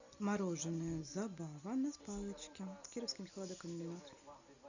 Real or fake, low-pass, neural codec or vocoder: real; 7.2 kHz; none